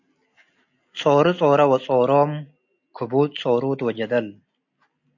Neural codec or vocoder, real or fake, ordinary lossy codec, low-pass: none; real; AAC, 48 kbps; 7.2 kHz